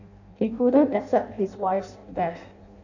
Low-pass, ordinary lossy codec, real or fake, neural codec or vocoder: 7.2 kHz; none; fake; codec, 16 kHz in and 24 kHz out, 0.6 kbps, FireRedTTS-2 codec